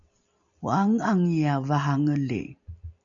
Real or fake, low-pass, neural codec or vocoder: real; 7.2 kHz; none